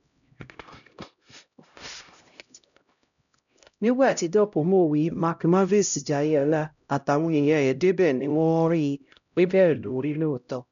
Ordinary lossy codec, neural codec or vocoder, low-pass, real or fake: none; codec, 16 kHz, 0.5 kbps, X-Codec, HuBERT features, trained on LibriSpeech; 7.2 kHz; fake